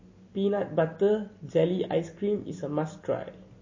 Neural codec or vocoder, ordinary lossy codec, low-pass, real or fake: none; MP3, 32 kbps; 7.2 kHz; real